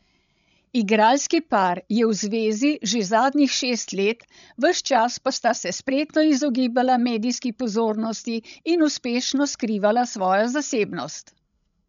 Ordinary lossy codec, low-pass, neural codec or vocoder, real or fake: none; 7.2 kHz; codec, 16 kHz, 16 kbps, FreqCodec, larger model; fake